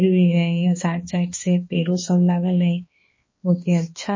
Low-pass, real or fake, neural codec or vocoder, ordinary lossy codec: 7.2 kHz; fake; codec, 16 kHz, 2 kbps, X-Codec, HuBERT features, trained on balanced general audio; MP3, 32 kbps